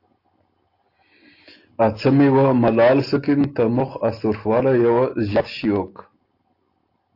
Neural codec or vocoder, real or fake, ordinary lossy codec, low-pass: none; real; AAC, 32 kbps; 5.4 kHz